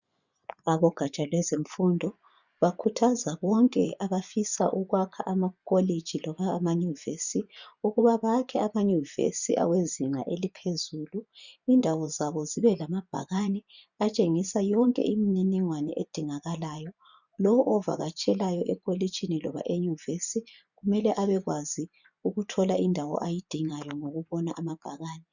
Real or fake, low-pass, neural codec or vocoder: fake; 7.2 kHz; vocoder, 24 kHz, 100 mel bands, Vocos